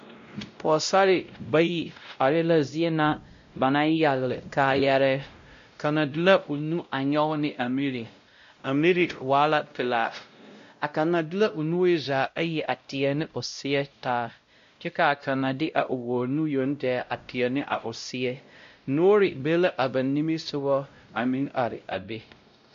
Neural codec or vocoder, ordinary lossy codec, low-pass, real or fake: codec, 16 kHz, 0.5 kbps, X-Codec, WavLM features, trained on Multilingual LibriSpeech; MP3, 48 kbps; 7.2 kHz; fake